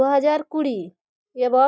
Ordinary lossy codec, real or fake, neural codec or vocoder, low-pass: none; real; none; none